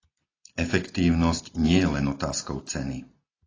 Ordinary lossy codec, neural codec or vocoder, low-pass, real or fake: AAC, 32 kbps; none; 7.2 kHz; real